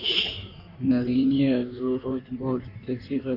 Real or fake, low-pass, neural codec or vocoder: fake; 5.4 kHz; codec, 16 kHz in and 24 kHz out, 1.1 kbps, FireRedTTS-2 codec